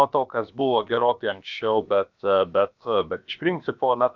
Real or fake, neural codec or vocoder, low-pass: fake; codec, 16 kHz, about 1 kbps, DyCAST, with the encoder's durations; 7.2 kHz